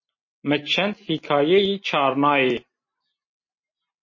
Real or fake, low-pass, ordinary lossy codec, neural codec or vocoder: real; 7.2 kHz; MP3, 32 kbps; none